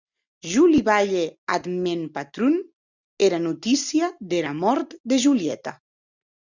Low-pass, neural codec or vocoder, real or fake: 7.2 kHz; none; real